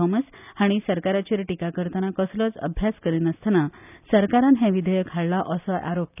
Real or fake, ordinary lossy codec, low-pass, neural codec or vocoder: real; none; 3.6 kHz; none